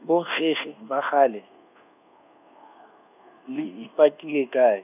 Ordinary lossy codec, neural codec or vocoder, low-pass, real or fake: none; codec, 24 kHz, 1.2 kbps, DualCodec; 3.6 kHz; fake